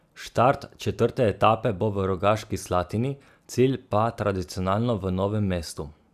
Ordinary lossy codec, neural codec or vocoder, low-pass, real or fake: none; none; 14.4 kHz; real